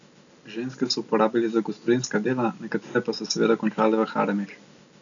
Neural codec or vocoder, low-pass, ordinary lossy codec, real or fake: none; 7.2 kHz; none; real